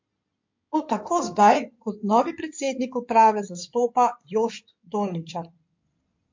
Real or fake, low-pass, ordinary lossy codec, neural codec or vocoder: fake; 7.2 kHz; MP3, 48 kbps; codec, 16 kHz in and 24 kHz out, 2.2 kbps, FireRedTTS-2 codec